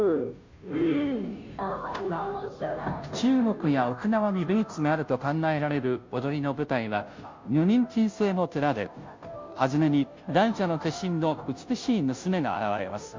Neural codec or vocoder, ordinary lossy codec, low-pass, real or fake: codec, 16 kHz, 0.5 kbps, FunCodec, trained on Chinese and English, 25 frames a second; MP3, 48 kbps; 7.2 kHz; fake